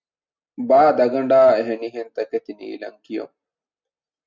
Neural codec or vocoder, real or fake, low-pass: none; real; 7.2 kHz